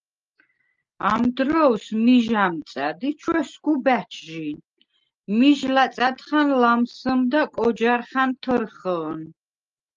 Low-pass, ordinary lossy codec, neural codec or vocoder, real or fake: 7.2 kHz; Opus, 32 kbps; none; real